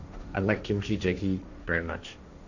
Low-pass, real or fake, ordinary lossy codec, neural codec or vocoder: 7.2 kHz; fake; none; codec, 16 kHz, 1.1 kbps, Voila-Tokenizer